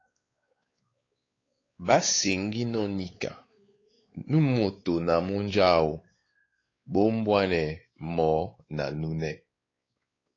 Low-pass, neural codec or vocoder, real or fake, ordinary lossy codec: 7.2 kHz; codec, 16 kHz, 4 kbps, X-Codec, WavLM features, trained on Multilingual LibriSpeech; fake; AAC, 32 kbps